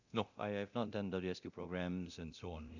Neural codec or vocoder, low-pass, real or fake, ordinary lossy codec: codec, 24 kHz, 0.9 kbps, DualCodec; 7.2 kHz; fake; none